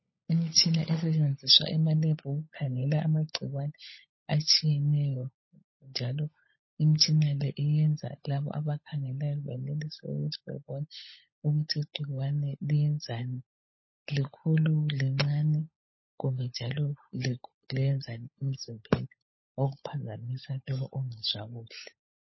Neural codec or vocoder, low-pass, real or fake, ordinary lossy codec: codec, 16 kHz, 16 kbps, FunCodec, trained on LibriTTS, 50 frames a second; 7.2 kHz; fake; MP3, 24 kbps